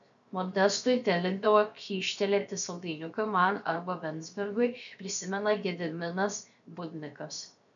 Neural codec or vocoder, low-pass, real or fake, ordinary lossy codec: codec, 16 kHz, 0.7 kbps, FocalCodec; 7.2 kHz; fake; MP3, 96 kbps